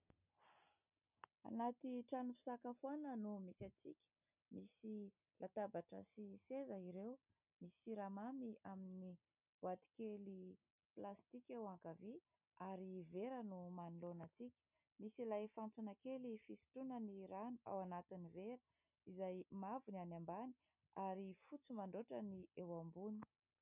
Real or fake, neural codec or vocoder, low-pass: real; none; 3.6 kHz